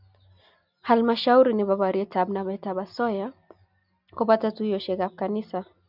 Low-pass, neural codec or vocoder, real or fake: 5.4 kHz; none; real